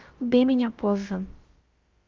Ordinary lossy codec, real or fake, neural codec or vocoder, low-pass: Opus, 24 kbps; fake; codec, 16 kHz, about 1 kbps, DyCAST, with the encoder's durations; 7.2 kHz